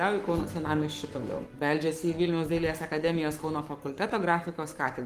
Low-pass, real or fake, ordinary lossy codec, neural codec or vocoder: 14.4 kHz; fake; Opus, 32 kbps; codec, 44.1 kHz, 7.8 kbps, Pupu-Codec